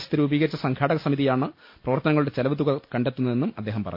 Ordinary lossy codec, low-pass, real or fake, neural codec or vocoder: MP3, 32 kbps; 5.4 kHz; real; none